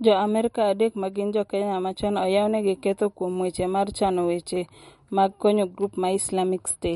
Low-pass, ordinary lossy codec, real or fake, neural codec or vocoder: 19.8 kHz; MP3, 48 kbps; real; none